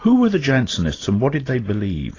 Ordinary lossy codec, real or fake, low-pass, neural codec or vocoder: AAC, 32 kbps; real; 7.2 kHz; none